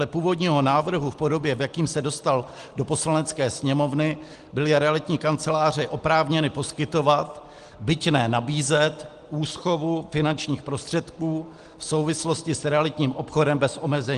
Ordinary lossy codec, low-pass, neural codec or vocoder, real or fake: Opus, 24 kbps; 10.8 kHz; none; real